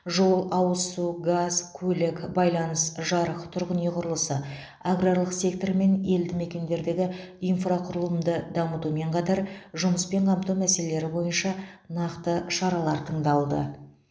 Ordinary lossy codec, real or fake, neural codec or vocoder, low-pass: none; real; none; none